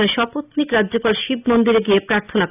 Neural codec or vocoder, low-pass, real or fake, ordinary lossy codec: none; 3.6 kHz; real; none